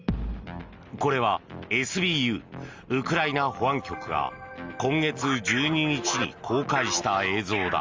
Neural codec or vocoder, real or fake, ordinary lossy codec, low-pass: none; real; Opus, 32 kbps; 7.2 kHz